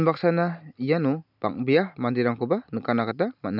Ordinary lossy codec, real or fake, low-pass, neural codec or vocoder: none; real; 5.4 kHz; none